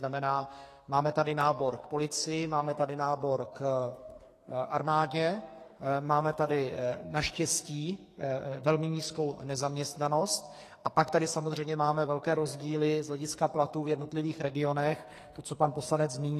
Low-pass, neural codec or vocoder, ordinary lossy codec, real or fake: 14.4 kHz; codec, 44.1 kHz, 2.6 kbps, SNAC; AAC, 48 kbps; fake